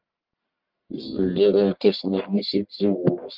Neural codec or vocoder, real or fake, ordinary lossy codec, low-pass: codec, 44.1 kHz, 1.7 kbps, Pupu-Codec; fake; Opus, 32 kbps; 5.4 kHz